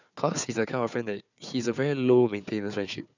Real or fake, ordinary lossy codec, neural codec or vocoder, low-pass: fake; none; codec, 16 kHz, 4 kbps, FunCodec, trained on Chinese and English, 50 frames a second; 7.2 kHz